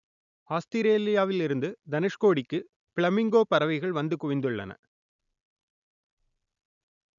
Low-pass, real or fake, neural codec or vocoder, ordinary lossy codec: 7.2 kHz; real; none; none